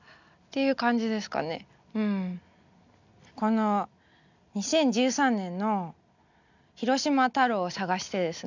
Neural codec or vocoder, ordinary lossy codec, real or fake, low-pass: none; none; real; 7.2 kHz